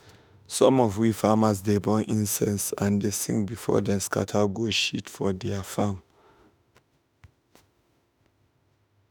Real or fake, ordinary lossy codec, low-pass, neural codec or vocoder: fake; none; none; autoencoder, 48 kHz, 32 numbers a frame, DAC-VAE, trained on Japanese speech